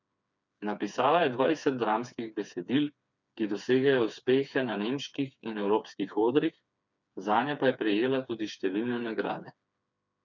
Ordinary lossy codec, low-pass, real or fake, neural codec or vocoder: none; 7.2 kHz; fake; codec, 16 kHz, 4 kbps, FreqCodec, smaller model